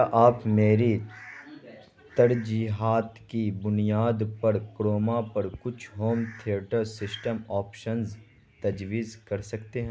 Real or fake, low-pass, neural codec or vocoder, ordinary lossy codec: real; none; none; none